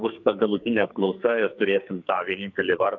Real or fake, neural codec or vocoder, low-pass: fake; codec, 44.1 kHz, 2.6 kbps, SNAC; 7.2 kHz